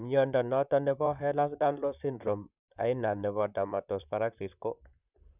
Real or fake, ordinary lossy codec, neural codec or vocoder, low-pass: fake; none; vocoder, 44.1 kHz, 128 mel bands, Pupu-Vocoder; 3.6 kHz